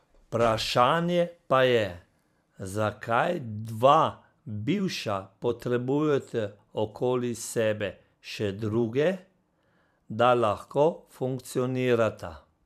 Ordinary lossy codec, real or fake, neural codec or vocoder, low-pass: none; fake; vocoder, 44.1 kHz, 128 mel bands every 512 samples, BigVGAN v2; 14.4 kHz